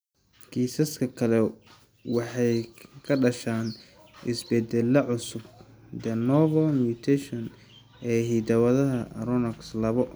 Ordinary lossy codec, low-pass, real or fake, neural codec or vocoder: none; none; real; none